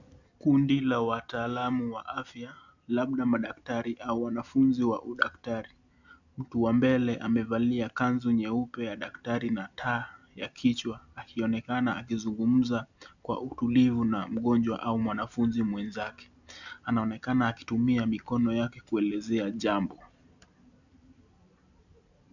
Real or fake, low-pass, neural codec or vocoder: real; 7.2 kHz; none